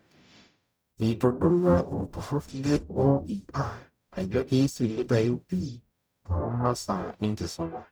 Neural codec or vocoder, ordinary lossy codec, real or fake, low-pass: codec, 44.1 kHz, 0.9 kbps, DAC; none; fake; none